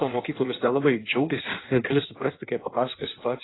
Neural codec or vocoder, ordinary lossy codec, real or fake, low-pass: codec, 16 kHz in and 24 kHz out, 1.1 kbps, FireRedTTS-2 codec; AAC, 16 kbps; fake; 7.2 kHz